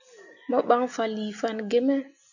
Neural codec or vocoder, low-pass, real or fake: none; 7.2 kHz; real